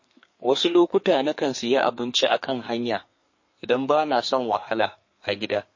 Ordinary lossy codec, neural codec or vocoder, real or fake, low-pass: MP3, 32 kbps; codec, 32 kHz, 1.9 kbps, SNAC; fake; 7.2 kHz